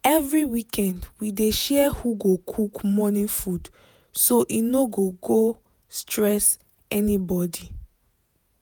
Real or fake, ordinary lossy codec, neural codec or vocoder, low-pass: fake; none; vocoder, 48 kHz, 128 mel bands, Vocos; none